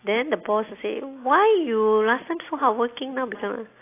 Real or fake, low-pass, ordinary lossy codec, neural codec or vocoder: real; 3.6 kHz; AAC, 24 kbps; none